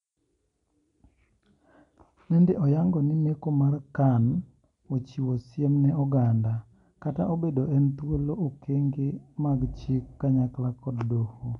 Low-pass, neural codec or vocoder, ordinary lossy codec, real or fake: 10.8 kHz; none; none; real